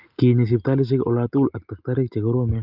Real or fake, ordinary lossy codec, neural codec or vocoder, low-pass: real; Opus, 24 kbps; none; 5.4 kHz